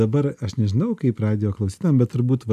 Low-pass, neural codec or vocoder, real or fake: 14.4 kHz; none; real